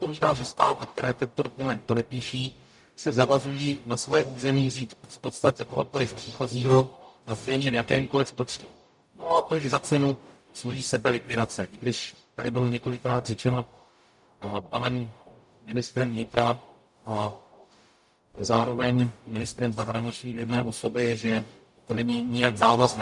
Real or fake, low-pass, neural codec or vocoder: fake; 10.8 kHz; codec, 44.1 kHz, 0.9 kbps, DAC